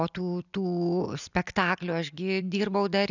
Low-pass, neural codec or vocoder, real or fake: 7.2 kHz; none; real